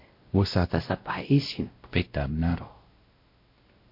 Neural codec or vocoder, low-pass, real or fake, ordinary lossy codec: codec, 16 kHz, 0.5 kbps, X-Codec, WavLM features, trained on Multilingual LibriSpeech; 5.4 kHz; fake; MP3, 32 kbps